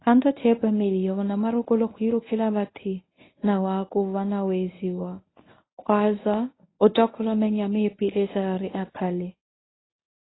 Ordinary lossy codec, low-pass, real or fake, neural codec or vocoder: AAC, 16 kbps; 7.2 kHz; fake; codec, 24 kHz, 0.9 kbps, WavTokenizer, medium speech release version 1